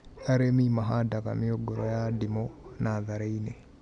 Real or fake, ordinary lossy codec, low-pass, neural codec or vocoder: real; none; 9.9 kHz; none